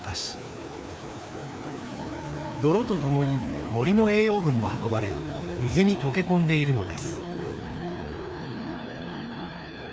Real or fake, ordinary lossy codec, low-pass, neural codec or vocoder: fake; none; none; codec, 16 kHz, 2 kbps, FreqCodec, larger model